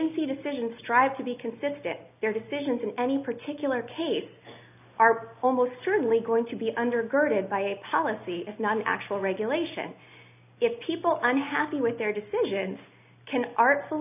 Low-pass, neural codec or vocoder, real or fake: 3.6 kHz; none; real